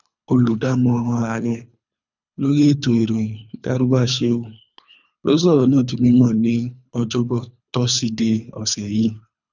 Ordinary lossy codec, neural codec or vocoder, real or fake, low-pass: none; codec, 24 kHz, 3 kbps, HILCodec; fake; 7.2 kHz